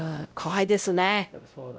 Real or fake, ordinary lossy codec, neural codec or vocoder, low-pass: fake; none; codec, 16 kHz, 0.5 kbps, X-Codec, WavLM features, trained on Multilingual LibriSpeech; none